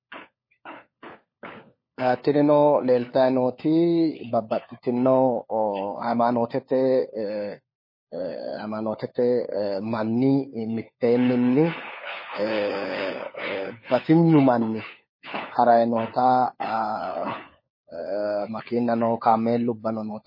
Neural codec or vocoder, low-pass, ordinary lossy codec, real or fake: codec, 16 kHz, 4 kbps, FunCodec, trained on LibriTTS, 50 frames a second; 5.4 kHz; MP3, 24 kbps; fake